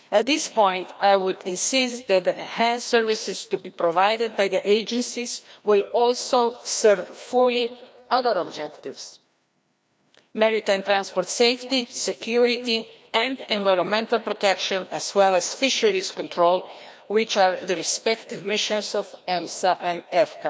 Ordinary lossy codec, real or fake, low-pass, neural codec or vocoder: none; fake; none; codec, 16 kHz, 1 kbps, FreqCodec, larger model